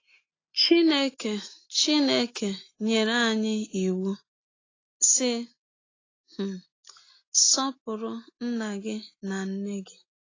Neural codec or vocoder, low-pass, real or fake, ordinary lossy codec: none; 7.2 kHz; real; AAC, 32 kbps